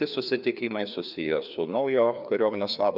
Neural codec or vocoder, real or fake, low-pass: codec, 16 kHz, 2 kbps, FreqCodec, larger model; fake; 5.4 kHz